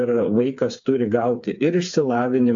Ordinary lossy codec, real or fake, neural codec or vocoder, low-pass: AAC, 64 kbps; fake; codec, 16 kHz, 4 kbps, FreqCodec, smaller model; 7.2 kHz